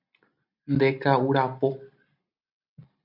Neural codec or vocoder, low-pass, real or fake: none; 5.4 kHz; real